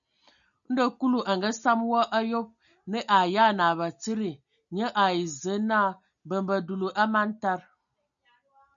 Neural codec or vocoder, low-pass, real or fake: none; 7.2 kHz; real